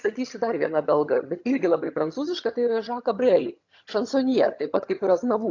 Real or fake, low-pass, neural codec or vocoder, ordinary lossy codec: fake; 7.2 kHz; vocoder, 22.05 kHz, 80 mel bands, HiFi-GAN; AAC, 48 kbps